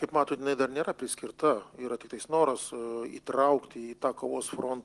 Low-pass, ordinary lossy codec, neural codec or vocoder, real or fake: 10.8 kHz; Opus, 24 kbps; none; real